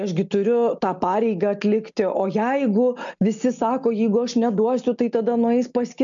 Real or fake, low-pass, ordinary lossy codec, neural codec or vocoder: real; 7.2 kHz; AAC, 64 kbps; none